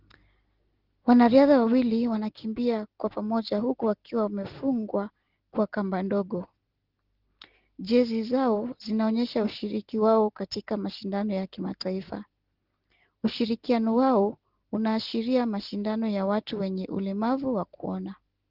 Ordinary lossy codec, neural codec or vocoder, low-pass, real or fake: Opus, 16 kbps; none; 5.4 kHz; real